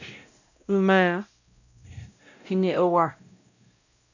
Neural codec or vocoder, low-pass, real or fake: codec, 16 kHz, 0.5 kbps, X-Codec, WavLM features, trained on Multilingual LibriSpeech; 7.2 kHz; fake